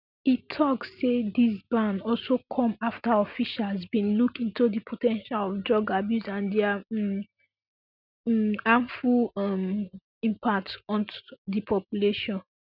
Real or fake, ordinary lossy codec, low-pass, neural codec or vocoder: real; none; 5.4 kHz; none